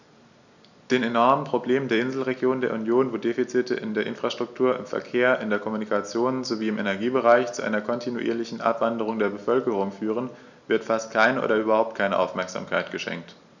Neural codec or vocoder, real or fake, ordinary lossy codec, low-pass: none; real; none; 7.2 kHz